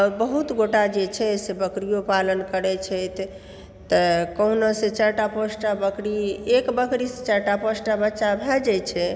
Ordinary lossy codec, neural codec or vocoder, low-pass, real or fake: none; none; none; real